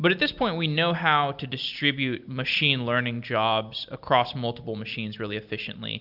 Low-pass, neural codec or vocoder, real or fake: 5.4 kHz; none; real